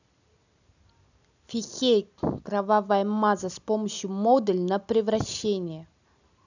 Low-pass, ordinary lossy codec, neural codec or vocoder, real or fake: 7.2 kHz; none; none; real